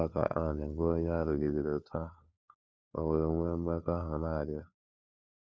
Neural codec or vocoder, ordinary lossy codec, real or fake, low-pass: codec, 16 kHz, 4 kbps, FunCodec, trained on LibriTTS, 50 frames a second; none; fake; none